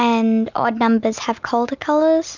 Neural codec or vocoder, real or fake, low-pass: none; real; 7.2 kHz